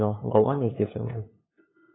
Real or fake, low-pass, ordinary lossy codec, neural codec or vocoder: fake; 7.2 kHz; AAC, 16 kbps; codec, 16 kHz, 2 kbps, FunCodec, trained on LibriTTS, 25 frames a second